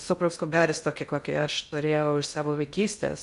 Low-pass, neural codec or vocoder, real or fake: 10.8 kHz; codec, 16 kHz in and 24 kHz out, 0.6 kbps, FocalCodec, streaming, 2048 codes; fake